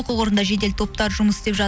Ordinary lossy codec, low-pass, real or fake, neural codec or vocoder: none; none; real; none